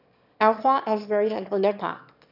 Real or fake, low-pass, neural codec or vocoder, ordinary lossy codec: fake; 5.4 kHz; autoencoder, 22.05 kHz, a latent of 192 numbers a frame, VITS, trained on one speaker; none